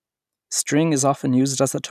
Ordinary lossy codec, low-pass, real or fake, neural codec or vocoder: none; 14.4 kHz; real; none